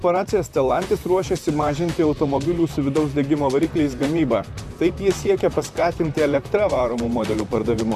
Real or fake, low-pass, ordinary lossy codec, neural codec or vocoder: fake; 14.4 kHz; MP3, 96 kbps; vocoder, 44.1 kHz, 128 mel bands, Pupu-Vocoder